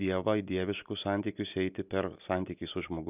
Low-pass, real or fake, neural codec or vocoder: 3.6 kHz; real; none